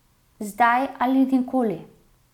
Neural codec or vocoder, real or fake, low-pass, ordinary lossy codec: none; real; 19.8 kHz; none